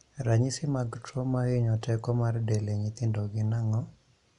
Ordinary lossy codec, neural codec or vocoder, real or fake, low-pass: none; none; real; 10.8 kHz